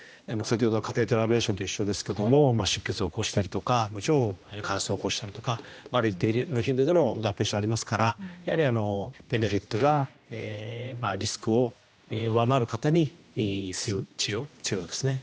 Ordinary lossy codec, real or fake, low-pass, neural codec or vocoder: none; fake; none; codec, 16 kHz, 1 kbps, X-Codec, HuBERT features, trained on general audio